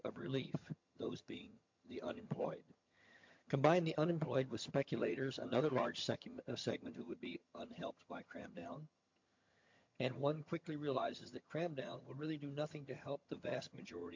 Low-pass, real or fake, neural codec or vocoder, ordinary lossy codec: 7.2 kHz; fake; vocoder, 22.05 kHz, 80 mel bands, HiFi-GAN; MP3, 48 kbps